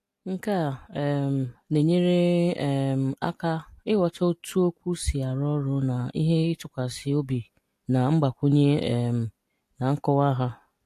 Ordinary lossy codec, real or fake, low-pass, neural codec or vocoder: AAC, 64 kbps; real; 14.4 kHz; none